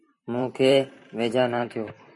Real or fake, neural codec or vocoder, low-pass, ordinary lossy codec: fake; vocoder, 44.1 kHz, 128 mel bands every 512 samples, BigVGAN v2; 10.8 kHz; MP3, 48 kbps